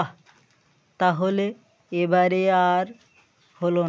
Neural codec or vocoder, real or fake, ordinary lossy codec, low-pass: none; real; none; none